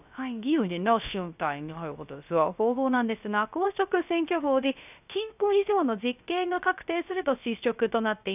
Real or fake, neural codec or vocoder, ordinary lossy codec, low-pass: fake; codec, 16 kHz, 0.3 kbps, FocalCodec; none; 3.6 kHz